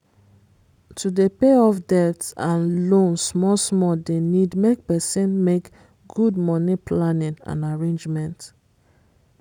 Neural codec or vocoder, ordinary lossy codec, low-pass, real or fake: none; none; 19.8 kHz; real